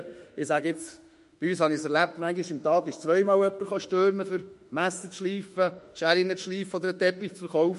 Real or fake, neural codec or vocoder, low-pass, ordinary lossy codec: fake; autoencoder, 48 kHz, 32 numbers a frame, DAC-VAE, trained on Japanese speech; 14.4 kHz; MP3, 48 kbps